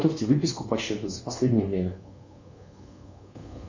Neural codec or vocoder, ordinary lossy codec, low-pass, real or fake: codec, 24 kHz, 1.2 kbps, DualCodec; Opus, 64 kbps; 7.2 kHz; fake